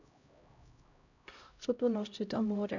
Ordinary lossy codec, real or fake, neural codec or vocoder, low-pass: none; fake; codec, 16 kHz, 0.5 kbps, X-Codec, HuBERT features, trained on LibriSpeech; 7.2 kHz